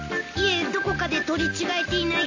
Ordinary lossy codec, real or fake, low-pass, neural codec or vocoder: AAC, 48 kbps; real; 7.2 kHz; none